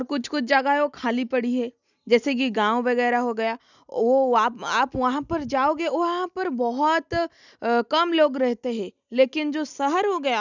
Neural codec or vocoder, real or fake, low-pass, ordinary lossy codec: none; real; 7.2 kHz; none